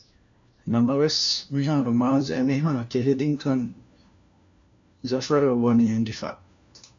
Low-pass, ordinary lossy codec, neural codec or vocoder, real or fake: 7.2 kHz; MP3, 64 kbps; codec, 16 kHz, 1 kbps, FunCodec, trained on LibriTTS, 50 frames a second; fake